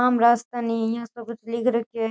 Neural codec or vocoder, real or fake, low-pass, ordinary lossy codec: none; real; none; none